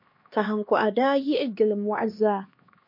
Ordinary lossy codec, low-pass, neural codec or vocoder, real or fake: MP3, 32 kbps; 5.4 kHz; codec, 16 kHz, 2 kbps, X-Codec, HuBERT features, trained on LibriSpeech; fake